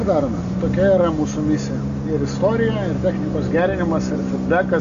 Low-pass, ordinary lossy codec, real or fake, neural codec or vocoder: 7.2 kHz; AAC, 64 kbps; real; none